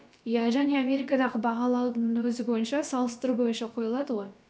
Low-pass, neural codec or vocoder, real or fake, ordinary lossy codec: none; codec, 16 kHz, about 1 kbps, DyCAST, with the encoder's durations; fake; none